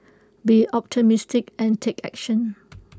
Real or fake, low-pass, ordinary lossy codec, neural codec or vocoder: real; none; none; none